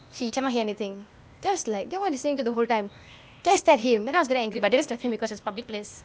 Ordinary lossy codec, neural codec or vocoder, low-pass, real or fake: none; codec, 16 kHz, 0.8 kbps, ZipCodec; none; fake